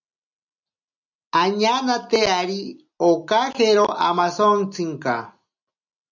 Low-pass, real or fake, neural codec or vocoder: 7.2 kHz; real; none